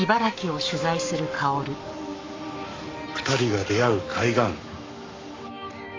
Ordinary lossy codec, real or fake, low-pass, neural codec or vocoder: MP3, 48 kbps; fake; 7.2 kHz; codec, 44.1 kHz, 7.8 kbps, Pupu-Codec